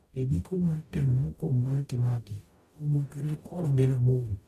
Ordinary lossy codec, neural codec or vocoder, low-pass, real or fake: AAC, 64 kbps; codec, 44.1 kHz, 0.9 kbps, DAC; 14.4 kHz; fake